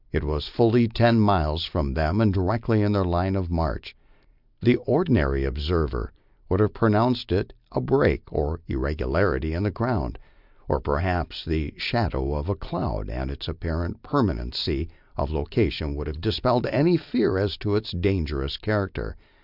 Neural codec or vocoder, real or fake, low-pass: none; real; 5.4 kHz